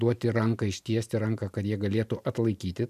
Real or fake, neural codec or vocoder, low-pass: fake; vocoder, 44.1 kHz, 128 mel bands every 512 samples, BigVGAN v2; 14.4 kHz